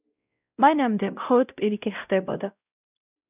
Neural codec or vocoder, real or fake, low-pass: codec, 16 kHz, 0.5 kbps, X-Codec, WavLM features, trained on Multilingual LibriSpeech; fake; 3.6 kHz